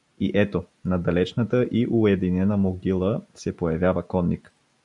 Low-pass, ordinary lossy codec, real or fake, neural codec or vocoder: 10.8 kHz; MP3, 96 kbps; real; none